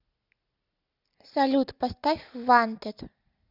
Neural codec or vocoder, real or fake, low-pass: none; real; 5.4 kHz